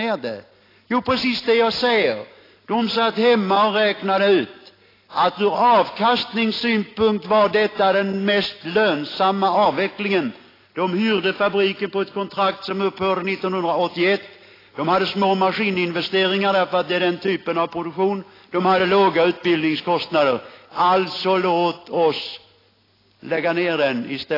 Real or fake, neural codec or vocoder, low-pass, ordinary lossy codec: real; none; 5.4 kHz; AAC, 24 kbps